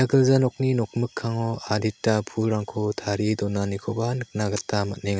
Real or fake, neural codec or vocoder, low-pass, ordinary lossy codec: real; none; none; none